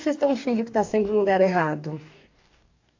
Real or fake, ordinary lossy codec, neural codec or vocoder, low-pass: fake; AAC, 48 kbps; codec, 44.1 kHz, 2.6 kbps, DAC; 7.2 kHz